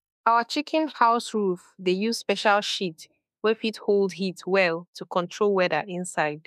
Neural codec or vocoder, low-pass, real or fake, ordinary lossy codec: autoencoder, 48 kHz, 32 numbers a frame, DAC-VAE, trained on Japanese speech; 14.4 kHz; fake; none